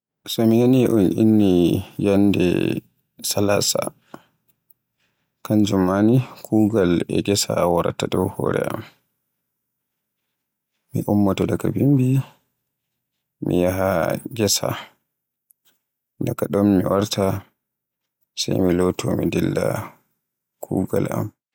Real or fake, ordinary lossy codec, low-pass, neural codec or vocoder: real; none; 19.8 kHz; none